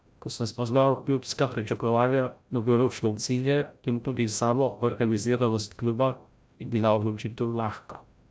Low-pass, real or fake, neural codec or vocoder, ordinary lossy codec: none; fake; codec, 16 kHz, 0.5 kbps, FreqCodec, larger model; none